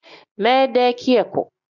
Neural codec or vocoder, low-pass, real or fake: none; 7.2 kHz; real